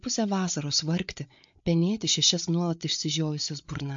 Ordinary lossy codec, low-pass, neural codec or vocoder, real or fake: MP3, 48 kbps; 7.2 kHz; codec, 16 kHz, 8 kbps, FreqCodec, larger model; fake